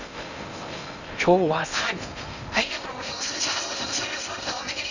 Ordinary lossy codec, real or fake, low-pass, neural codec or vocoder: none; fake; 7.2 kHz; codec, 16 kHz in and 24 kHz out, 0.6 kbps, FocalCodec, streaming, 2048 codes